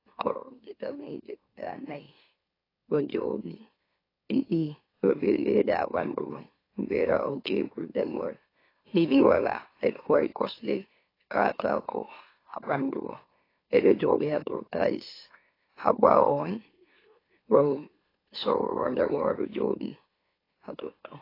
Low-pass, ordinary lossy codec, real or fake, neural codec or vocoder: 5.4 kHz; AAC, 24 kbps; fake; autoencoder, 44.1 kHz, a latent of 192 numbers a frame, MeloTTS